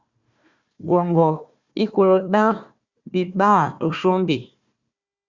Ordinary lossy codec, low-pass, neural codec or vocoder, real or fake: Opus, 64 kbps; 7.2 kHz; codec, 16 kHz, 1 kbps, FunCodec, trained on Chinese and English, 50 frames a second; fake